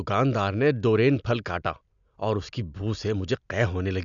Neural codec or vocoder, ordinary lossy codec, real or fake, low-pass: none; none; real; 7.2 kHz